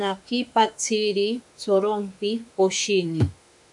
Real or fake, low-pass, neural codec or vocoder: fake; 10.8 kHz; autoencoder, 48 kHz, 32 numbers a frame, DAC-VAE, trained on Japanese speech